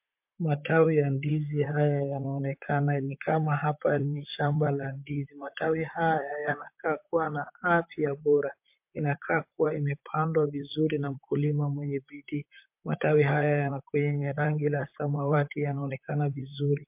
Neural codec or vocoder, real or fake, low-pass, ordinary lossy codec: vocoder, 44.1 kHz, 128 mel bands, Pupu-Vocoder; fake; 3.6 kHz; MP3, 32 kbps